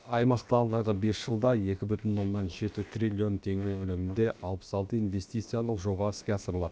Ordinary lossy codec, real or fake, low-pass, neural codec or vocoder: none; fake; none; codec, 16 kHz, about 1 kbps, DyCAST, with the encoder's durations